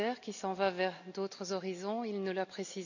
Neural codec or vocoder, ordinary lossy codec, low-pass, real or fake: none; none; 7.2 kHz; real